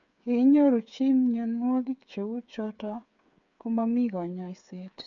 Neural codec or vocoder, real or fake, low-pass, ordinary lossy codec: codec, 16 kHz, 8 kbps, FreqCodec, smaller model; fake; 7.2 kHz; MP3, 64 kbps